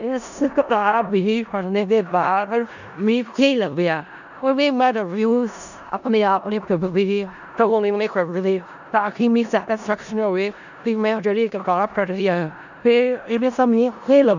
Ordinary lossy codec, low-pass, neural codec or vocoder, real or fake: none; 7.2 kHz; codec, 16 kHz in and 24 kHz out, 0.4 kbps, LongCat-Audio-Codec, four codebook decoder; fake